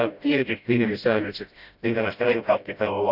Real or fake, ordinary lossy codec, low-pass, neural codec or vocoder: fake; none; 5.4 kHz; codec, 16 kHz, 0.5 kbps, FreqCodec, smaller model